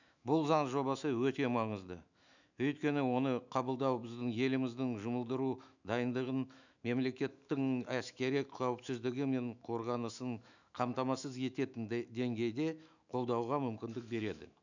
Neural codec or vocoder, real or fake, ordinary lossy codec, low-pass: autoencoder, 48 kHz, 128 numbers a frame, DAC-VAE, trained on Japanese speech; fake; none; 7.2 kHz